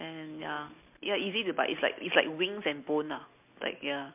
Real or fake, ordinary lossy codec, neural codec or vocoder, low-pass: real; MP3, 32 kbps; none; 3.6 kHz